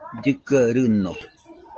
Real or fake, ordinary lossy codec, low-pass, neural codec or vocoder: real; Opus, 16 kbps; 7.2 kHz; none